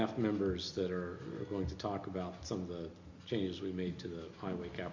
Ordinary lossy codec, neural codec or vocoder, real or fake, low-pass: MP3, 64 kbps; none; real; 7.2 kHz